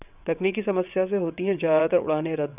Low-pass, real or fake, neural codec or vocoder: 3.6 kHz; fake; vocoder, 22.05 kHz, 80 mel bands, WaveNeXt